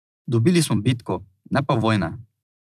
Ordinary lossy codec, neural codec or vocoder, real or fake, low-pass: none; vocoder, 44.1 kHz, 128 mel bands every 256 samples, BigVGAN v2; fake; 14.4 kHz